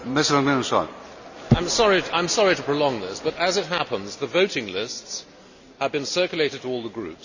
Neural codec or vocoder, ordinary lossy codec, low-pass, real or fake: none; none; 7.2 kHz; real